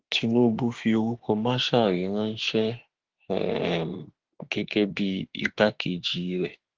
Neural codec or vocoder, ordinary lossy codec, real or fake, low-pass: autoencoder, 48 kHz, 32 numbers a frame, DAC-VAE, trained on Japanese speech; Opus, 16 kbps; fake; 7.2 kHz